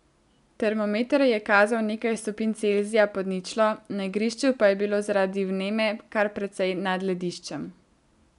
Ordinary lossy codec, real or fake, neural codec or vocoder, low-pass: none; real; none; 10.8 kHz